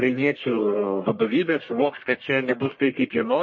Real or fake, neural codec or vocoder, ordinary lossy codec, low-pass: fake; codec, 44.1 kHz, 1.7 kbps, Pupu-Codec; MP3, 32 kbps; 7.2 kHz